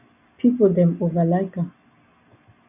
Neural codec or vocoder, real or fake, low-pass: none; real; 3.6 kHz